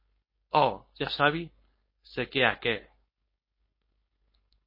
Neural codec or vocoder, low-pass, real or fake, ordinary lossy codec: codec, 16 kHz, 4.8 kbps, FACodec; 5.4 kHz; fake; MP3, 24 kbps